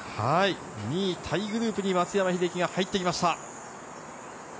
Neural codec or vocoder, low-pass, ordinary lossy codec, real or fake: none; none; none; real